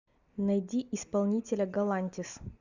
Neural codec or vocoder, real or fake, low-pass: none; real; 7.2 kHz